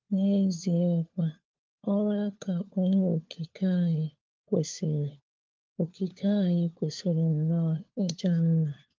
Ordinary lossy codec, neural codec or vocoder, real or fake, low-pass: Opus, 32 kbps; codec, 16 kHz, 4 kbps, FunCodec, trained on LibriTTS, 50 frames a second; fake; 7.2 kHz